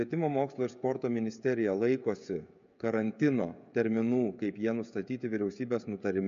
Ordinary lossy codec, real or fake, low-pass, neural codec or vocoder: MP3, 64 kbps; fake; 7.2 kHz; codec, 16 kHz, 16 kbps, FreqCodec, smaller model